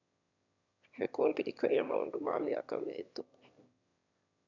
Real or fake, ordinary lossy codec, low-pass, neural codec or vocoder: fake; none; 7.2 kHz; autoencoder, 22.05 kHz, a latent of 192 numbers a frame, VITS, trained on one speaker